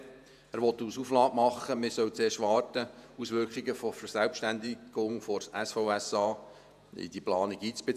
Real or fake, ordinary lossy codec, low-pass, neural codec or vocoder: fake; none; 14.4 kHz; vocoder, 48 kHz, 128 mel bands, Vocos